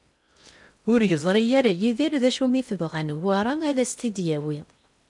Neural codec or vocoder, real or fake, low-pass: codec, 16 kHz in and 24 kHz out, 0.6 kbps, FocalCodec, streaming, 2048 codes; fake; 10.8 kHz